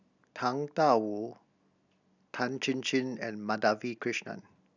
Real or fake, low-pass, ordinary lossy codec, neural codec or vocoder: real; 7.2 kHz; none; none